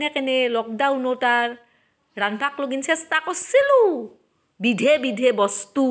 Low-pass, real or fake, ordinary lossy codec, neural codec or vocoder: none; real; none; none